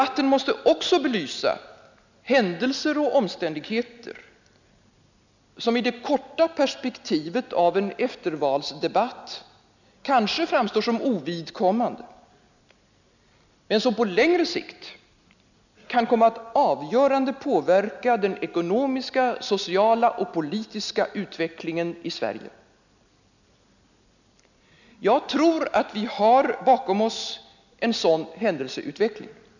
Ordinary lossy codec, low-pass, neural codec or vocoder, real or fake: none; 7.2 kHz; none; real